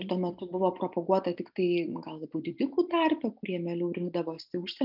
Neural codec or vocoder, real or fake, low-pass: none; real; 5.4 kHz